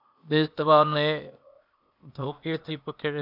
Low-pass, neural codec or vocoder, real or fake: 5.4 kHz; codec, 16 kHz, 0.8 kbps, ZipCodec; fake